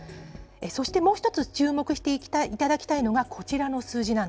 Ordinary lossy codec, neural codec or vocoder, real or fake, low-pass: none; none; real; none